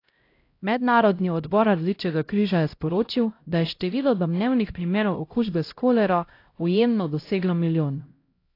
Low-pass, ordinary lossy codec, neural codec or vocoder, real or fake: 5.4 kHz; AAC, 32 kbps; codec, 16 kHz, 0.5 kbps, X-Codec, HuBERT features, trained on LibriSpeech; fake